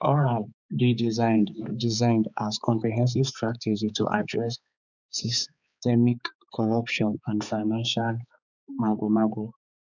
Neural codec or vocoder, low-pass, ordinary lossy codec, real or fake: codec, 16 kHz, 4 kbps, X-Codec, HuBERT features, trained on balanced general audio; 7.2 kHz; none; fake